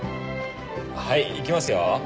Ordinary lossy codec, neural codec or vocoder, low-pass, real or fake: none; none; none; real